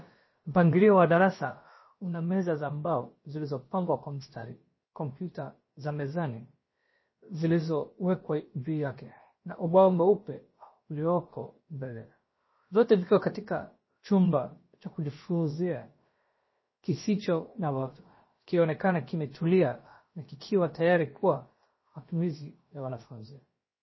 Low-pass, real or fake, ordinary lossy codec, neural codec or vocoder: 7.2 kHz; fake; MP3, 24 kbps; codec, 16 kHz, about 1 kbps, DyCAST, with the encoder's durations